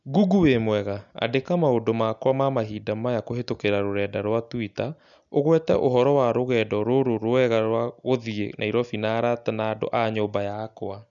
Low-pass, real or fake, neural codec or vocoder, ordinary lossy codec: 7.2 kHz; real; none; none